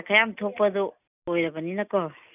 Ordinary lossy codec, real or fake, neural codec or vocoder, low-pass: none; real; none; 3.6 kHz